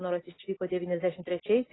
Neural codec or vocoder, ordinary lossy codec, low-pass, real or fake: none; AAC, 16 kbps; 7.2 kHz; real